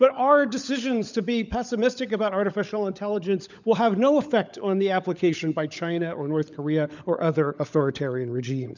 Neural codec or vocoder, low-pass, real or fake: codec, 16 kHz, 16 kbps, FunCodec, trained on LibriTTS, 50 frames a second; 7.2 kHz; fake